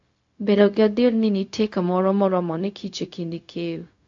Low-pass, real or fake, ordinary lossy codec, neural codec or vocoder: 7.2 kHz; fake; AAC, 48 kbps; codec, 16 kHz, 0.4 kbps, LongCat-Audio-Codec